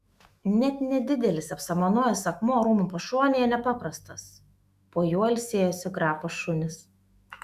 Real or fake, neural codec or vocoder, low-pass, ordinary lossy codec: fake; autoencoder, 48 kHz, 128 numbers a frame, DAC-VAE, trained on Japanese speech; 14.4 kHz; Opus, 64 kbps